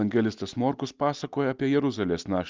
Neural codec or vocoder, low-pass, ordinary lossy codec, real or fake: none; 7.2 kHz; Opus, 24 kbps; real